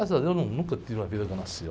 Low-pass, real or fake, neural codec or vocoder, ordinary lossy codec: none; real; none; none